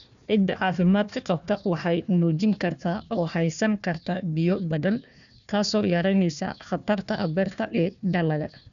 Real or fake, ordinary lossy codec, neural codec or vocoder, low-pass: fake; none; codec, 16 kHz, 1 kbps, FunCodec, trained on Chinese and English, 50 frames a second; 7.2 kHz